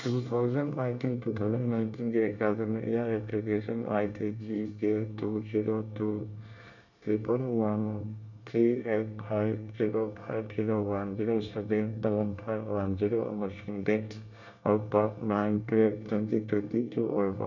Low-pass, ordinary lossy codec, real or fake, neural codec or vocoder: 7.2 kHz; none; fake; codec, 24 kHz, 1 kbps, SNAC